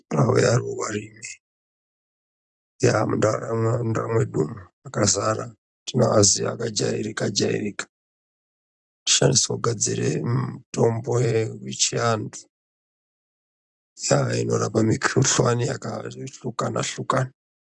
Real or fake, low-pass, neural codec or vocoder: real; 9.9 kHz; none